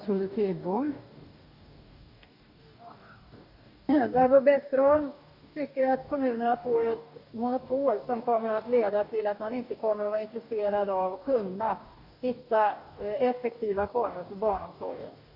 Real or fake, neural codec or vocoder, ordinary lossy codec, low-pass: fake; codec, 44.1 kHz, 2.6 kbps, DAC; none; 5.4 kHz